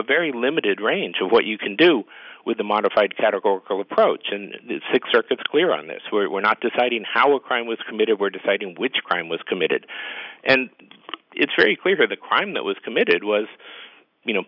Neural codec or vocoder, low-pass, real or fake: none; 5.4 kHz; real